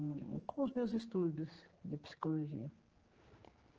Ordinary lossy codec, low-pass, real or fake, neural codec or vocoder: Opus, 16 kbps; 7.2 kHz; fake; codec, 16 kHz, 4 kbps, X-Codec, HuBERT features, trained on general audio